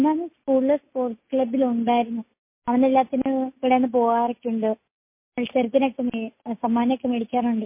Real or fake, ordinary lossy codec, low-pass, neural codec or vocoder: real; MP3, 24 kbps; 3.6 kHz; none